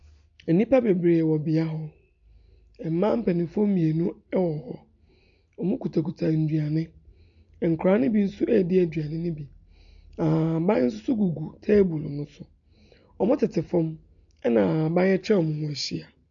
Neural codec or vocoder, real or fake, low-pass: none; real; 7.2 kHz